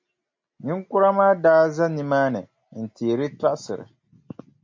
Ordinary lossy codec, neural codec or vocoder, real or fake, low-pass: MP3, 48 kbps; none; real; 7.2 kHz